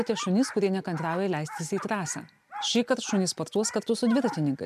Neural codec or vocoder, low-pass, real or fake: none; 14.4 kHz; real